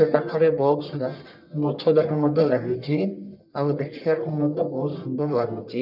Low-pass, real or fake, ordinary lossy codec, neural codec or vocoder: 5.4 kHz; fake; none; codec, 44.1 kHz, 1.7 kbps, Pupu-Codec